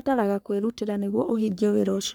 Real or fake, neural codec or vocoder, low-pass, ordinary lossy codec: fake; codec, 44.1 kHz, 3.4 kbps, Pupu-Codec; none; none